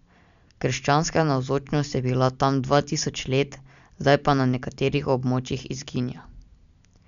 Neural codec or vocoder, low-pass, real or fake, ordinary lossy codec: none; 7.2 kHz; real; none